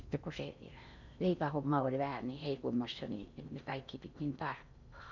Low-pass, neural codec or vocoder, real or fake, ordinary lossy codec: 7.2 kHz; codec, 16 kHz in and 24 kHz out, 0.6 kbps, FocalCodec, streaming, 4096 codes; fake; none